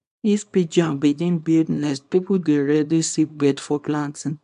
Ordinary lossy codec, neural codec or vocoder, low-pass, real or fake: MP3, 64 kbps; codec, 24 kHz, 0.9 kbps, WavTokenizer, small release; 10.8 kHz; fake